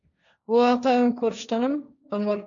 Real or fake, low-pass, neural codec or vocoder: fake; 7.2 kHz; codec, 16 kHz, 1.1 kbps, Voila-Tokenizer